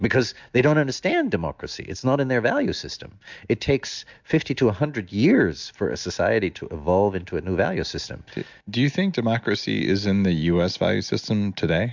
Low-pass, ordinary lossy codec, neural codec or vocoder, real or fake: 7.2 kHz; MP3, 64 kbps; none; real